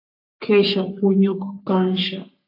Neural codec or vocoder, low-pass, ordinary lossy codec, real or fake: codec, 44.1 kHz, 3.4 kbps, Pupu-Codec; 5.4 kHz; AAC, 32 kbps; fake